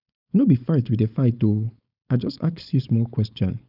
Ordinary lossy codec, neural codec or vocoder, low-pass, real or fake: none; codec, 16 kHz, 4.8 kbps, FACodec; 5.4 kHz; fake